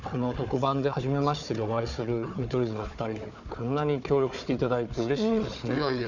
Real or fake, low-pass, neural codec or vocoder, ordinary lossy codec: fake; 7.2 kHz; codec, 16 kHz, 4 kbps, FunCodec, trained on Chinese and English, 50 frames a second; none